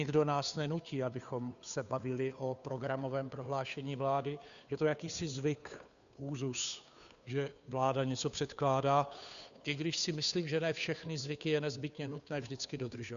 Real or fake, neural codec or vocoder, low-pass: fake; codec, 16 kHz, 2 kbps, FunCodec, trained on Chinese and English, 25 frames a second; 7.2 kHz